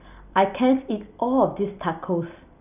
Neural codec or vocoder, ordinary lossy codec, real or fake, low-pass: none; none; real; 3.6 kHz